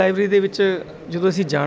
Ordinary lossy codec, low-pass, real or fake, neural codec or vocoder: none; none; real; none